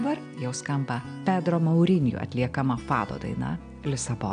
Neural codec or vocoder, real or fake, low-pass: none; real; 9.9 kHz